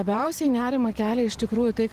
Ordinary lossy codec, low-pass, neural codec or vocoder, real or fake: Opus, 16 kbps; 14.4 kHz; vocoder, 44.1 kHz, 128 mel bands every 512 samples, BigVGAN v2; fake